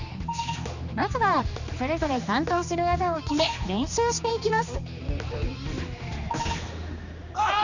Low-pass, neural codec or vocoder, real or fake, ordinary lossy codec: 7.2 kHz; codec, 16 kHz, 2 kbps, X-Codec, HuBERT features, trained on general audio; fake; none